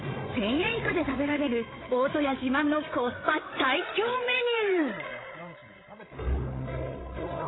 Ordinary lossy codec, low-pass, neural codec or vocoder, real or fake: AAC, 16 kbps; 7.2 kHz; codec, 16 kHz, 8 kbps, FreqCodec, larger model; fake